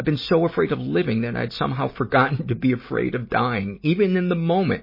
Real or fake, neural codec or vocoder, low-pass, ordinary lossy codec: real; none; 5.4 kHz; MP3, 24 kbps